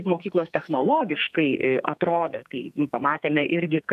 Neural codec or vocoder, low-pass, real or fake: codec, 44.1 kHz, 2.6 kbps, SNAC; 14.4 kHz; fake